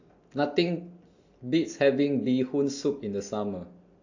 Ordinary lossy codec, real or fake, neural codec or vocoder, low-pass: AAC, 48 kbps; real; none; 7.2 kHz